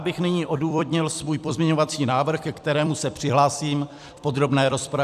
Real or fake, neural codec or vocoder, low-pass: fake; vocoder, 44.1 kHz, 128 mel bands every 256 samples, BigVGAN v2; 14.4 kHz